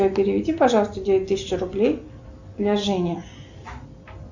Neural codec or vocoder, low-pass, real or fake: none; 7.2 kHz; real